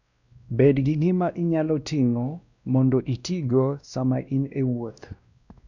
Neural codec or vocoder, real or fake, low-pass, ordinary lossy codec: codec, 16 kHz, 1 kbps, X-Codec, WavLM features, trained on Multilingual LibriSpeech; fake; 7.2 kHz; none